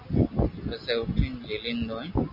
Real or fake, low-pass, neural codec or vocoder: real; 5.4 kHz; none